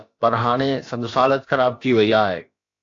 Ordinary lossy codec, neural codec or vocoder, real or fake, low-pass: AAC, 48 kbps; codec, 16 kHz, about 1 kbps, DyCAST, with the encoder's durations; fake; 7.2 kHz